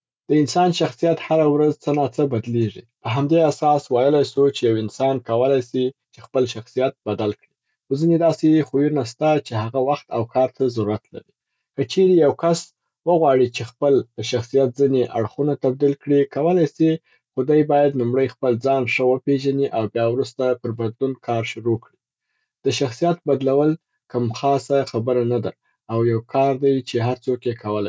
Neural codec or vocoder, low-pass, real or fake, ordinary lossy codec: none; none; real; none